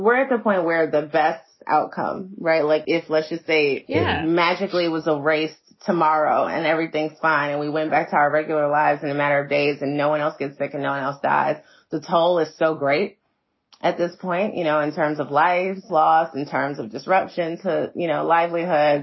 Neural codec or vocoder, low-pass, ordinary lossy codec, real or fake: none; 7.2 kHz; MP3, 24 kbps; real